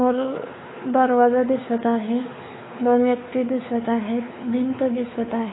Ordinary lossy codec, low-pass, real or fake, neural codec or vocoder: AAC, 16 kbps; 7.2 kHz; fake; codec, 16 kHz, 4 kbps, X-Codec, WavLM features, trained on Multilingual LibriSpeech